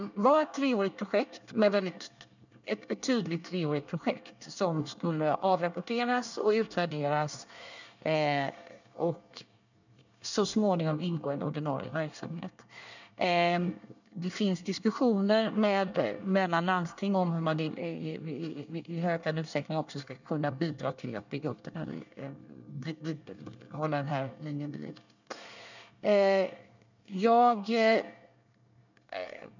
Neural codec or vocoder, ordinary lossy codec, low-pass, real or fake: codec, 24 kHz, 1 kbps, SNAC; none; 7.2 kHz; fake